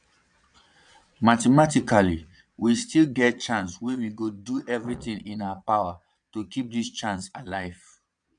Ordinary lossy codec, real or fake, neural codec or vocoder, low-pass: none; fake; vocoder, 22.05 kHz, 80 mel bands, Vocos; 9.9 kHz